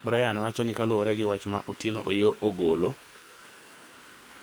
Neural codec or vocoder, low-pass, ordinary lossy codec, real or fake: codec, 44.1 kHz, 2.6 kbps, SNAC; none; none; fake